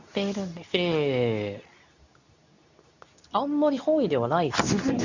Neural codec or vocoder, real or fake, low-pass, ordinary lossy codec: codec, 24 kHz, 0.9 kbps, WavTokenizer, medium speech release version 2; fake; 7.2 kHz; none